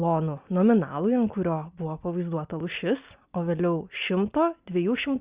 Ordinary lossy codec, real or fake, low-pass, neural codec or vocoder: Opus, 64 kbps; real; 3.6 kHz; none